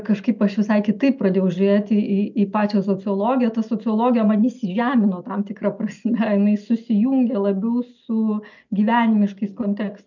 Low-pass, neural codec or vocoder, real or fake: 7.2 kHz; none; real